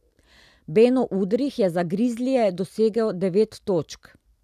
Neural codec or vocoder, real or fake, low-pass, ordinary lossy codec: none; real; 14.4 kHz; none